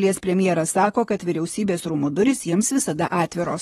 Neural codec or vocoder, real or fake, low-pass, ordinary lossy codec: vocoder, 44.1 kHz, 128 mel bands, Pupu-Vocoder; fake; 19.8 kHz; AAC, 32 kbps